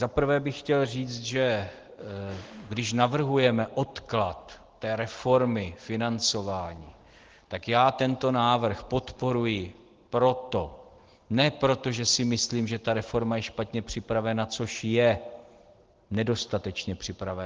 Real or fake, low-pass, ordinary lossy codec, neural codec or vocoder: real; 7.2 kHz; Opus, 16 kbps; none